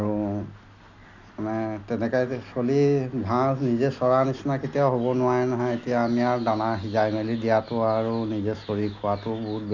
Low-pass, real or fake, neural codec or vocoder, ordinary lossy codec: 7.2 kHz; real; none; none